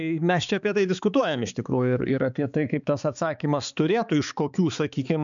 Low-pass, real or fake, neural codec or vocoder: 7.2 kHz; fake; codec, 16 kHz, 4 kbps, X-Codec, HuBERT features, trained on balanced general audio